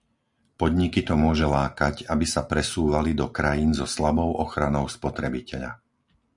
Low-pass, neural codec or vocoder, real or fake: 10.8 kHz; none; real